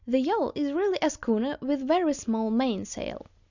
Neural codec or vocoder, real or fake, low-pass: none; real; 7.2 kHz